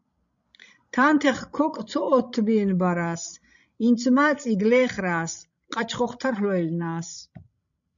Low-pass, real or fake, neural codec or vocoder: 7.2 kHz; fake; codec, 16 kHz, 16 kbps, FreqCodec, larger model